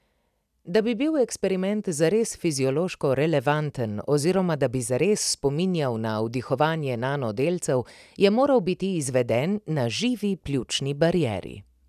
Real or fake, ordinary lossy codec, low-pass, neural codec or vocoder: real; none; 14.4 kHz; none